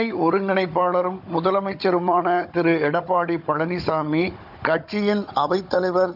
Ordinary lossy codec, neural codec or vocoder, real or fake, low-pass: none; codec, 16 kHz, 16 kbps, FunCodec, trained on Chinese and English, 50 frames a second; fake; 5.4 kHz